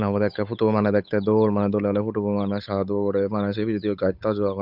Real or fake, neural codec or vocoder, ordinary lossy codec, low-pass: fake; codec, 16 kHz, 8 kbps, FunCodec, trained on Chinese and English, 25 frames a second; none; 5.4 kHz